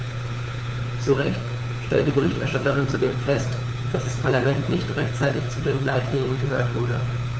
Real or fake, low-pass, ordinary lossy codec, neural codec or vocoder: fake; none; none; codec, 16 kHz, 4 kbps, FunCodec, trained on LibriTTS, 50 frames a second